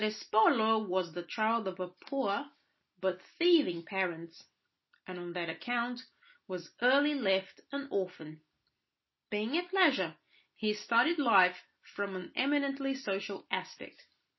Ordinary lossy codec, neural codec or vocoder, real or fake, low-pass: MP3, 24 kbps; none; real; 7.2 kHz